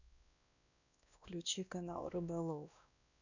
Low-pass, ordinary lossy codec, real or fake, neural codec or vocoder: 7.2 kHz; none; fake; codec, 16 kHz, 1 kbps, X-Codec, WavLM features, trained on Multilingual LibriSpeech